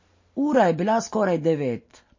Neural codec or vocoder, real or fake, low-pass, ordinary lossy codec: none; real; 7.2 kHz; MP3, 32 kbps